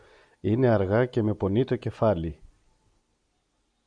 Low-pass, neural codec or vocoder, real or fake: 9.9 kHz; none; real